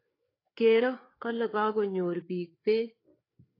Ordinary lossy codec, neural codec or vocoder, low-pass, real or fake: AAC, 24 kbps; codec, 16 kHz, 4 kbps, FreqCodec, larger model; 5.4 kHz; fake